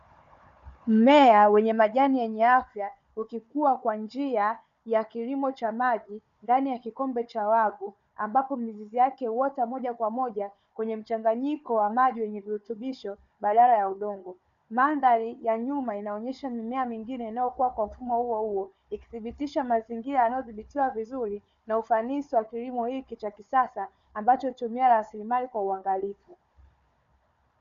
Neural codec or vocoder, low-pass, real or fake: codec, 16 kHz, 4 kbps, FunCodec, trained on Chinese and English, 50 frames a second; 7.2 kHz; fake